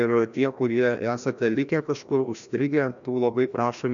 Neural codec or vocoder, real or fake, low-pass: codec, 16 kHz, 1 kbps, FreqCodec, larger model; fake; 7.2 kHz